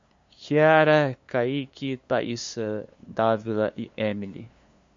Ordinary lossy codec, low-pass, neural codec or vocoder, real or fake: MP3, 48 kbps; 7.2 kHz; codec, 16 kHz, 2 kbps, FunCodec, trained on LibriTTS, 25 frames a second; fake